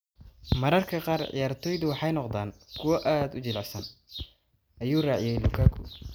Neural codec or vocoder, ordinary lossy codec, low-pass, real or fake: none; none; none; real